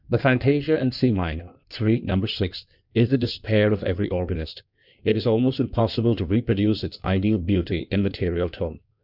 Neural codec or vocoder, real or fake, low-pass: codec, 16 kHz in and 24 kHz out, 1.1 kbps, FireRedTTS-2 codec; fake; 5.4 kHz